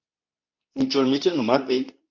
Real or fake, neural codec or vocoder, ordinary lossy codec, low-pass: fake; codec, 24 kHz, 0.9 kbps, WavTokenizer, medium speech release version 2; MP3, 64 kbps; 7.2 kHz